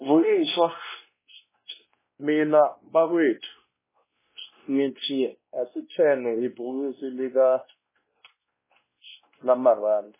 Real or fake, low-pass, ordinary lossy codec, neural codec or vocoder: fake; 3.6 kHz; MP3, 16 kbps; codec, 16 kHz, 1 kbps, X-Codec, WavLM features, trained on Multilingual LibriSpeech